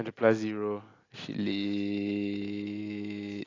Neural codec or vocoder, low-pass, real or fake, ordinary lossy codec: none; 7.2 kHz; real; AAC, 32 kbps